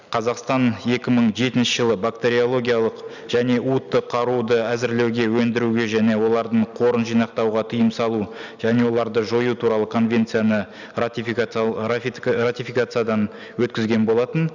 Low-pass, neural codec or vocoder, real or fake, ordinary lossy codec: 7.2 kHz; none; real; none